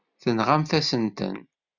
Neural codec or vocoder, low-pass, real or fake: none; 7.2 kHz; real